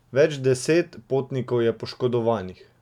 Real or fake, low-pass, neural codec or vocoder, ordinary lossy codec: real; 19.8 kHz; none; none